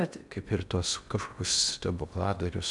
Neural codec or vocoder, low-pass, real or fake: codec, 16 kHz in and 24 kHz out, 0.6 kbps, FocalCodec, streaming, 2048 codes; 10.8 kHz; fake